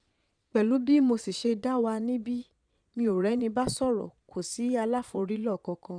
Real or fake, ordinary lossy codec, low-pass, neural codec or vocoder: fake; none; 9.9 kHz; vocoder, 22.05 kHz, 80 mel bands, Vocos